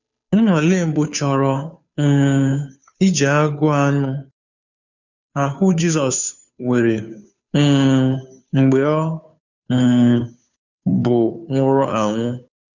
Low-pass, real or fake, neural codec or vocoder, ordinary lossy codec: 7.2 kHz; fake; codec, 16 kHz, 2 kbps, FunCodec, trained on Chinese and English, 25 frames a second; none